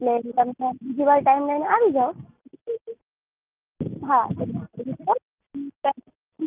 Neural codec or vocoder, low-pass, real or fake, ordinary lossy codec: none; 3.6 kHz; real; Opus, 32 kbps